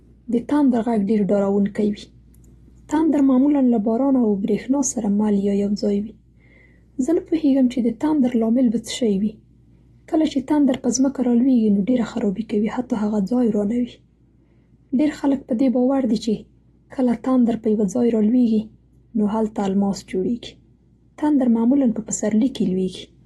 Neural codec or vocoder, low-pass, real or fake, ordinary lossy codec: none; 19.8 kHz; real; AAC, 32 kbps